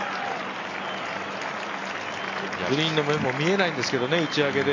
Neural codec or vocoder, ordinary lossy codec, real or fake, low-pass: none; MP3, 48 kbps; real; 7.2 kHz